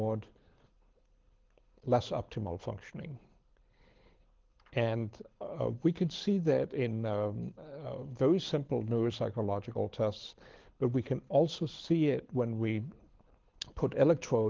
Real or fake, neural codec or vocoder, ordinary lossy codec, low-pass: fake; codec, 24 kHz, 6 kbps, HILCodec; Opus, 16 kbps; 7.2 kHz